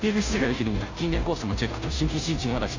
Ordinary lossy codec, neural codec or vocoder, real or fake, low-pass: AAC, 48 kbps; codec, 16 kHz, 0.5 kbps, FunCodec, trained on Chinese and English, 25 frames a second; fake; 7.2 kHz